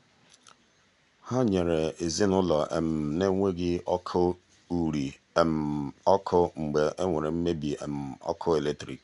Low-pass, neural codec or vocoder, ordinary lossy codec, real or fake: 10.8 kHz; none; MP3, 96 kbps; real